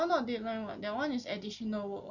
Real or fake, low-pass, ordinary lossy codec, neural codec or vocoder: real; 7.2 kHz; none; none